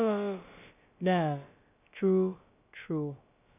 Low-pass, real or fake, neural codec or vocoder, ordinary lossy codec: 3.6 kHz; fake; codec, 16 kHz, about 1 kbps, DyCAST, with the encoder's durations; none